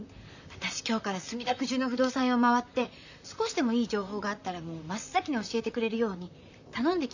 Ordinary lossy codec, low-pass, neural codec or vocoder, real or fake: none; 7.2 kHz; vocoder, 44.1 kHz, 128 mel bands, Pupu-Vocoder; fake